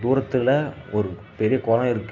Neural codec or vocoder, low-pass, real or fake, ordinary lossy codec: none; 7.2 kHz; real; AAC, 48 kbps